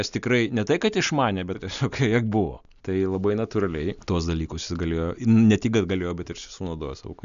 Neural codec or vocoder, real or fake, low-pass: none; real; 7.2 kHz